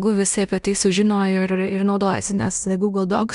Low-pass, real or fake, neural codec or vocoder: 10.8 kHz; fake; codec, 16 kHz in and 24 kHz out, 0.9 kbps, LongCat-Audio-Codec, fine tuned four codebook decoder